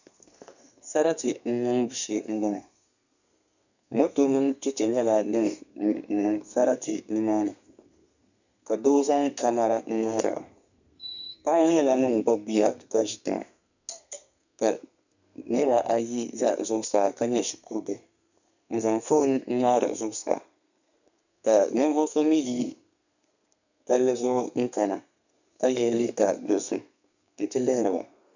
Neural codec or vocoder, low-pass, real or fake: codec, 32 kHz, 1.9 kbps, SNAC; 7.2 kHz; fake